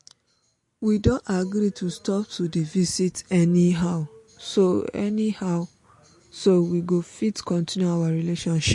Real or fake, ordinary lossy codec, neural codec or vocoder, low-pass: real; MP3, 48 kbps; none; 10.8 kHz